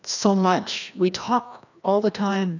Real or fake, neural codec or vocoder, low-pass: fake; codec, 16 kHz, 1 kbps, FreqCodec, larger model; 7.2 kHz